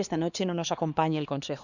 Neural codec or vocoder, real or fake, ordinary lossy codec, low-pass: codec, 16 kHz, 2 kbps, X-Codec, HuBERT features, trained on LibriSpeech; fake; none; 7.2 kHz